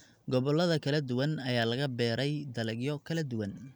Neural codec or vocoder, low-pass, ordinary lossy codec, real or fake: none; none; none; real